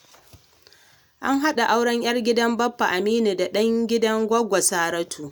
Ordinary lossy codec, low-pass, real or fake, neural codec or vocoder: none; none; real; none